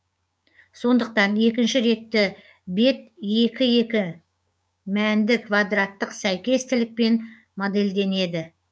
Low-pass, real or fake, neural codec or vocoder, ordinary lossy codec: none; fake; codec, 16 kHz, 6 kbps, DAC; none